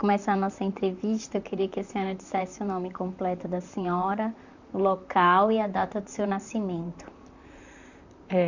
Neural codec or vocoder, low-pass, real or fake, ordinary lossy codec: vocoder, 44.1 kHz, 128 mel bands, Pupu-Vocoder; 7.2 kHz; fake; none